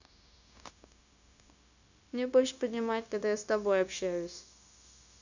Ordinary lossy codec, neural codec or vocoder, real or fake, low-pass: none; codec, 16 kHz, 0.9 kbps, LongCat-Audio-Codec; fake; 7.2 kHz